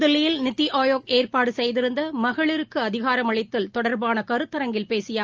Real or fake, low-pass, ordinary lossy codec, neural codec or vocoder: real; 7.2 kHz; Opus, 24 kbps; none